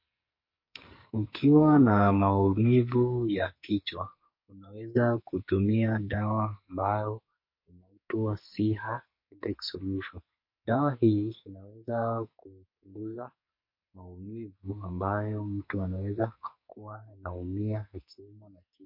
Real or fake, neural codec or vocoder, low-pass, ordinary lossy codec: fake; codec, 44.1 kHz, 2.6 kbps, SNAC; 5.4 kHz; MP3, 32 kbps